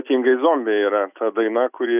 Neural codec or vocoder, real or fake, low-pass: none; real; 3.6 kHz